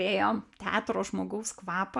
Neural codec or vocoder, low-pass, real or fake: none; 10.8 kHz; real